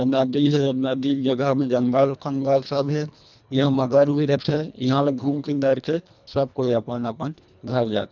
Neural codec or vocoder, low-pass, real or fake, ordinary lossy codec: codec, 24 kHz, 1.5 kbps, HILCodec; 7.2 kHz; fake; none